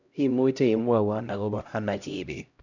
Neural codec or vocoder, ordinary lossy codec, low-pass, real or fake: codec, 16 kHz, 0.5 kbps, X-Codec, HuBERT features, trained on LibriSpeech; none; 7.2 kHz; fake